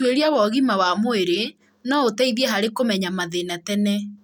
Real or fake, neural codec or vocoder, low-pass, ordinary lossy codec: real; none; none; none